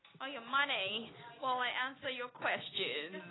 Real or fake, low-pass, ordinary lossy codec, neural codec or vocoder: real; 7.2 kHz; AAC, 16 kbps; none